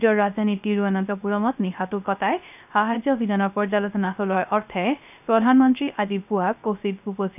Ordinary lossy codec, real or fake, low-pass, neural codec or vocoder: none; fake; 3.6 kHz; codec, 16 kHz, 0.3 kbps, FocalCodec